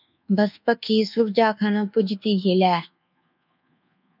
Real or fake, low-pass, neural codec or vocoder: fake; 5.4 kHz; codec, 24 kHz, 1.2 kbps, DualCodec